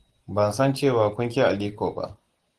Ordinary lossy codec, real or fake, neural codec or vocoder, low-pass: Opus, 16 kbps; real; none; 10.8 kHz